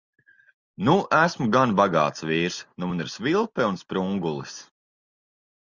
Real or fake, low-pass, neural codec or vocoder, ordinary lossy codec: real; 7.2 kHz; none; Opus, 64 kbps